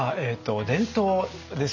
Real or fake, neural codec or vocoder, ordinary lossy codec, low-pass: real; none; none; 7.2 kHz